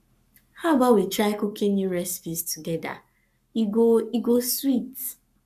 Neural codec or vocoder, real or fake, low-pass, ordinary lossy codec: codec, 44.1 kHz, 7.8 kbps, Pupu-Codec; fake; 14.4 kHz; none